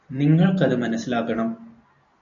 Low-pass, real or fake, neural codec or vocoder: 7.2 kHz; real; none